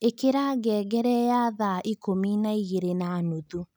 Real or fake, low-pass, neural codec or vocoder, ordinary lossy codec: real; none; none; none